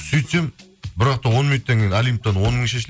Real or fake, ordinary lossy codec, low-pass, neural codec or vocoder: real; none; none; none